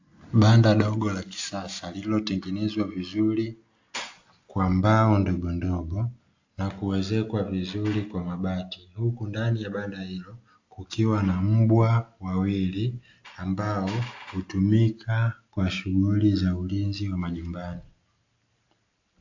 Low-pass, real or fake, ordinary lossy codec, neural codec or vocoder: 7.2 kHz; real; AAC, 48 kbps; none